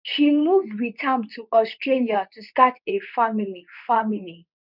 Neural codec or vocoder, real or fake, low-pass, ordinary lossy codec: codec, 24 kHz, 0.9 kbps, WavTokenizer, medium speech release version 2; fake; 5.4 kHz; none